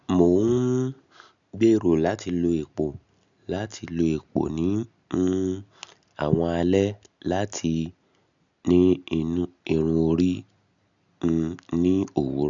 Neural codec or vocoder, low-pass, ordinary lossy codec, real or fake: none; 7.2 kHz; none; real